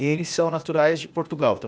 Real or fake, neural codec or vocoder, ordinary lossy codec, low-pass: fake; codec, 16 kHz, 0.8 kbps, ZipCodec; none; none